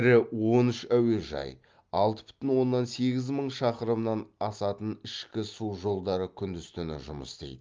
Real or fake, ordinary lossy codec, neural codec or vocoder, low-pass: real; Opus, 32 kbps; none; 7.2 kHz